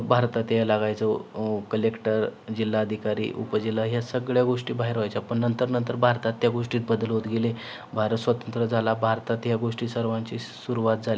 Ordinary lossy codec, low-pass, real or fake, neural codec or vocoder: none; none; real; none